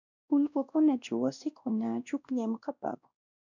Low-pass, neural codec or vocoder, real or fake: 7.2 kHz; codec, 16 kHz, 1 kbps, X-Codec, WavLM features, trained on Multilingual LibriSpeech; fake